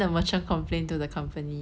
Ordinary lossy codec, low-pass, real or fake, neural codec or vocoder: none; none; real; none